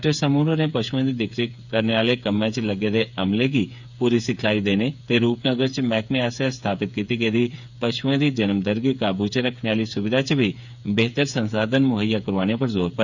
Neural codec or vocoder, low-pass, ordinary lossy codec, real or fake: codec, 16 kHz, 8 kbps, FreqCodec, smaller model; 7.2 kHz; none; fake